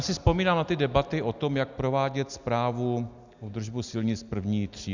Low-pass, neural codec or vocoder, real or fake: 7.2 kHz; none; real